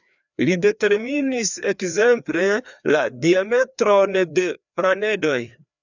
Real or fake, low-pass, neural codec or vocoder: fake; 7.2 kHz; codec, 16 kHz, 2 kbps, FreqCodec, larger model